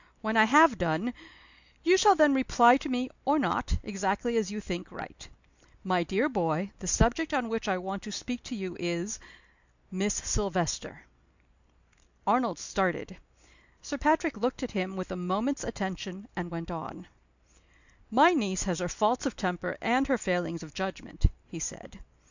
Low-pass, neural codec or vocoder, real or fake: 7.2 kHz; none; real